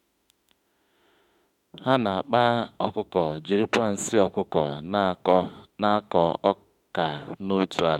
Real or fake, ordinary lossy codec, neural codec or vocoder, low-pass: fake; MP3, 96 kbps; autoencoder, 48 kHz, 32 numbers a frame, DAC-VAE, trained on Japanese speech; 19.8 kHz